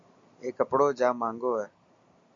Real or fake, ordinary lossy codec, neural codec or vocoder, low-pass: real; AAC, 48 kbps; none; 7.2 kHz